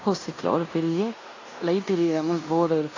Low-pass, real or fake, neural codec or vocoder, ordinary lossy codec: 7.2 kHz; fake; codec, 16 kHz in and 24 kHz out, 0.9 kbps, LongCat-Audio-Codec, fine tuned four codebook decoder; AAC, 32 kbps